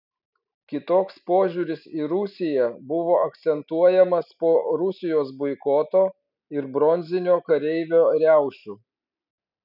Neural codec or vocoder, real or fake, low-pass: none; real; 5.4 kHz